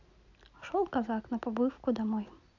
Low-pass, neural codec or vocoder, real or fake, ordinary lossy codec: 7.2 kHz; none; real; none